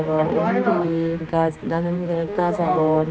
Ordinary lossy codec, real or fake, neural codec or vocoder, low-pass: none; fake; codec, 16 kHz, 2 kbps, X-Codec, HuBERT features, trained on general audio; none